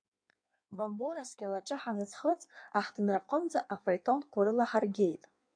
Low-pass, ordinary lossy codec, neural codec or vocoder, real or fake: 9.9 kHz; AAC, 64 kbps; codec, 16 kHz in and 24 kHz out, 1.1 kbps, FireRedTTS-2 codec; fake